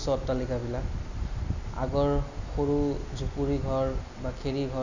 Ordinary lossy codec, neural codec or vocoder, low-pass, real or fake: none; none; 7.2 kHz; real